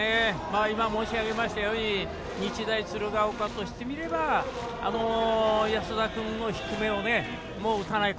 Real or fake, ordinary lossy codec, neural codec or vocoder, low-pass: real; none; none; none